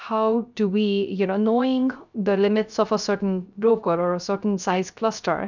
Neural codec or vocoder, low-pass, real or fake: codec, 16 kHz, 0.3 kbps, FocalCodec; 7.2 kHz; fake